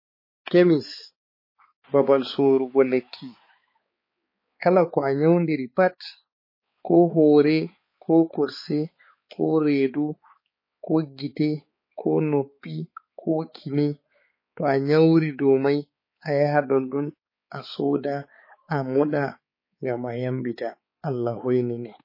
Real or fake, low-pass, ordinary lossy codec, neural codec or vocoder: fake; 5.4 kHz; MP3, 24 kbps; codec, 16 kHz, 4 kbps, X-Codec, HuBERT features, trained on balanced general audio